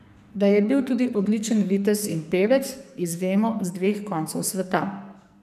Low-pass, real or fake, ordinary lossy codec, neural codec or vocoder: 14.4 kHz; fake; none; codec, 44.1 kHz, 2.6 kbps, SNAC